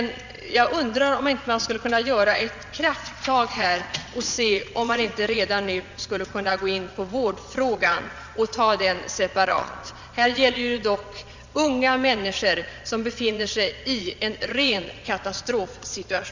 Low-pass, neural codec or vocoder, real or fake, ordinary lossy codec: 7.2 kHz; vocoder, 22.05 kHz, 80 mel bands, Vocos; fake; Opus, 64 kbps